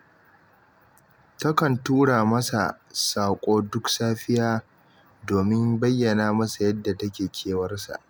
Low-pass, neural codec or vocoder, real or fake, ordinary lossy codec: none; none; real; none